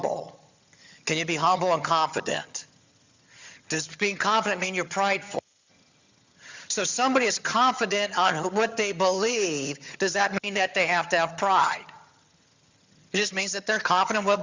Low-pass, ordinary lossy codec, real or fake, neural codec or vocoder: 7.2 kHz; Opus, 64 kbps; fake; vocoder, 22.05 kHz, 80 mel bands, HiFi-GAN